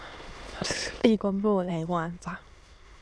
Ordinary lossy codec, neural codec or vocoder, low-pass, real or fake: none; autoencoder, 22.05 kHz, a latent of 192 numbers a frame, VITS, trained on many speakers; none; fake